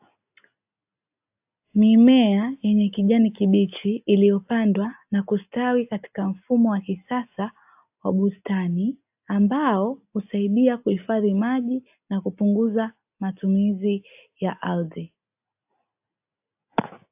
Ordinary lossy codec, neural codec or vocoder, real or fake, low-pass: AAC, 32 kbps; none; real; 3.6 kHz